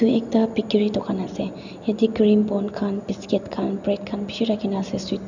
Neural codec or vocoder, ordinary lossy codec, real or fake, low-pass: none; none; real; 7.2 kHz